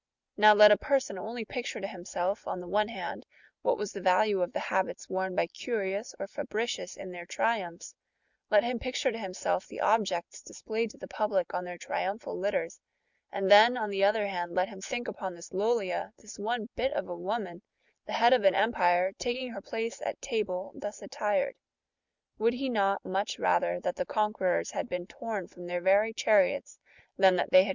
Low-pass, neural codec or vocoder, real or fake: 7.2 kHz; none; real